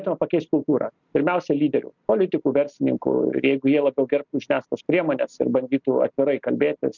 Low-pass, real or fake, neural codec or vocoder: 7.2 kHz; real; none